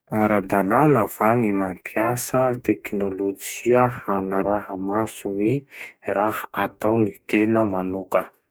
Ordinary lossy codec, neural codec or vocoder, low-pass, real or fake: none; codec, 44.1 kHz, 2.6 kbps, DAC; none; fake